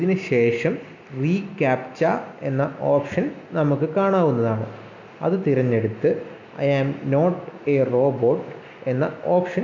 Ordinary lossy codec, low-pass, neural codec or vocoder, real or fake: none; 7.2 kHz; none; real